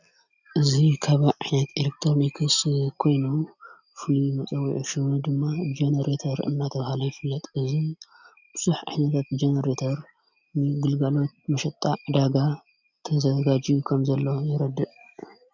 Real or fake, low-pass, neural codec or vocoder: real; 7.2 kHz; none